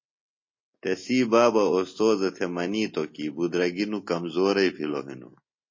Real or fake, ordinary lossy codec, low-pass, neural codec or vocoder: real; MP3, 32 kbps; 7.2 kHz; none